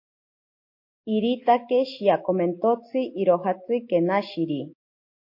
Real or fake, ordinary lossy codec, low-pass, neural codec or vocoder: real; MP3, 32 kbps; 5.4 kHz; none